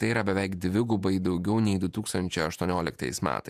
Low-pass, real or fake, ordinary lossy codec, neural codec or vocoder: 14.4 kHz; fake; AAC, 96 kbps; vocoder, 48 kHz, 128 mel bands, Vocos